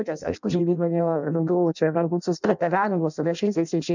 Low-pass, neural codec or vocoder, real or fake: 7.2 kHz; codec, 16 kHz in and 24 kHz out, 0.6 kbps, FireRedTTS-2 codec; fake